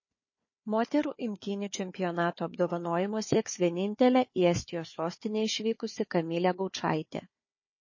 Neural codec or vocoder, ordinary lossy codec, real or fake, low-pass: codec, 16 kHz, 4 kbps, FunCodec, trained on Chinese and English, 50 frames a second; MP3, 32 kbps; fake; 7.2 kHz